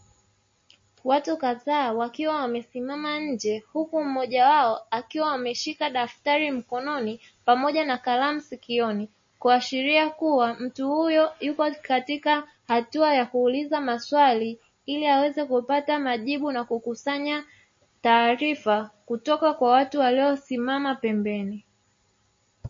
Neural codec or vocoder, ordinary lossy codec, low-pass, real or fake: none; MP3, 32 kbps; 7.2 kHz; real